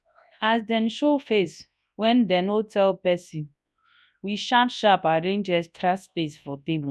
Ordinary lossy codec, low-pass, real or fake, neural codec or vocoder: none; none; fake; codec, 24 kHz, 0.9 kbps, WavTokenizer, large speech release